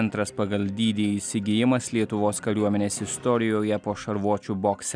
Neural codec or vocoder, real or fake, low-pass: none; real; 9.9 kHz